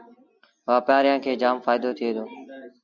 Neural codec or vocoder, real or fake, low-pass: none; real; 7.2 kHz